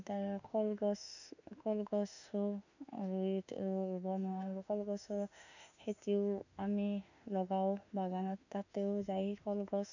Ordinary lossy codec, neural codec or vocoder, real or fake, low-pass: none; autoencoder, 48 kHz, 32 numbers a frame, DAC-VAE, trained on Japanese speech; fake; 7.2 kHz